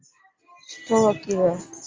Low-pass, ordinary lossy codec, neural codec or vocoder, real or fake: 7.2 kHz; Opus, 32 kbps; none; real